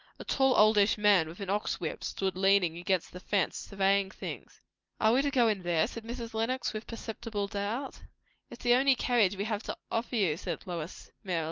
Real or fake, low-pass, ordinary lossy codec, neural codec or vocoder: real; 7.2 kHz; Opus, 32 kbps; none